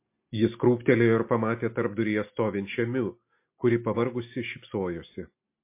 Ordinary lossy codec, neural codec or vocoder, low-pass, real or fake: MP3, 24 kbps; none; 3.6 kHz; real